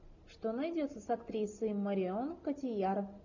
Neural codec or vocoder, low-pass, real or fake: none; 7.2 kHz; real